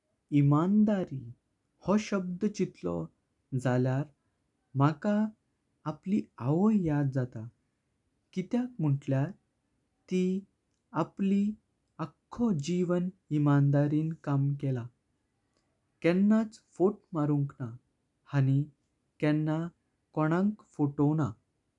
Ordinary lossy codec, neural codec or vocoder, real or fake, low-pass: none; none; real; 10.8 kHz